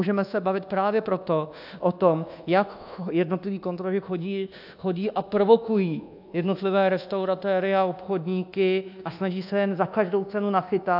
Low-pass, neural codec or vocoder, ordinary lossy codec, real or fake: 5.4 kHz; codec, 24 kHz, 1.2 kbps, DualCodec; AAC, 48 kbps; fake